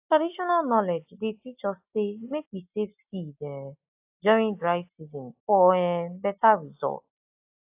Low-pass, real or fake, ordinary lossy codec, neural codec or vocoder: 3.6 kHz; real; AAC, 32 kbps; none